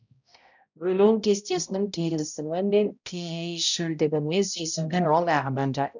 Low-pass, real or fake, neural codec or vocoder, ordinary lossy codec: 7.2 kHz; fake; codec, 16 kHz, 0.5 kbps, X-Codec, HuBERT features, trained on balanced general audio; none